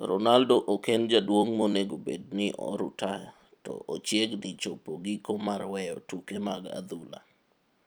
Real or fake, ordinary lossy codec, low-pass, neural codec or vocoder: fake; none; none; vocoder, 44.1 kHz, 128 mel bands every 256 samples, BigVGAN v2